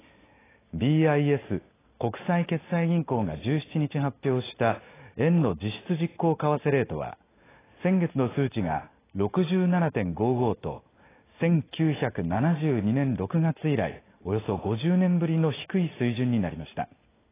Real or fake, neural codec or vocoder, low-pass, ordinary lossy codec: real; none; 3.6 kHz; AAC, 16 kbps